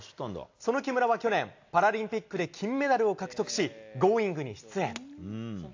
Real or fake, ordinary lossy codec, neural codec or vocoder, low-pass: real; AAC, 48 kbps; none; 7.2 kHz